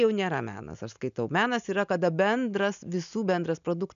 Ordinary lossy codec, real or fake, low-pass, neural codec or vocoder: MP3, 96 kbps; real; 7.2 kHz; none